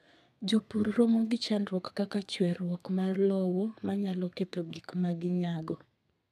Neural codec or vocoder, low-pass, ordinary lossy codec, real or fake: codec, 32 kHz, 1.9 kbps, SNAC; 14.4 kHz; none; fake